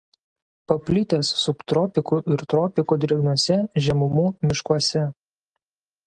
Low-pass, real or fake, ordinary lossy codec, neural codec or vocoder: 9.9 kHz; real; Opus, 24 kbps; none